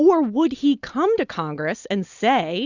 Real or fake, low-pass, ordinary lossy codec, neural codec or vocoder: real; 7.2 kHz; Opus, 64 kbps; none